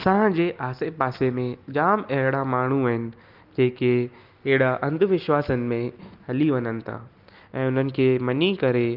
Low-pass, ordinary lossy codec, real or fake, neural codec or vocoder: 5.4 kHz; Opus, 24 kbps; real; none